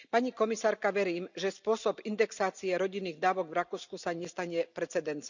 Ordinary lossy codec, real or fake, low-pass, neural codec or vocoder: none; real; 7.2 kHz; none